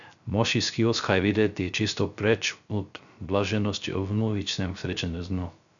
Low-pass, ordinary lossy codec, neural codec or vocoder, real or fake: 7.2 kHz; none; codec, 16 kHz, 0.3 kbps, FocalCodec; fake